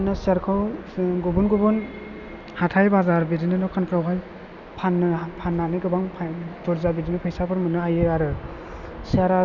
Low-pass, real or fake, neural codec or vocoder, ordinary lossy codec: 7.2 kHz; real; none; none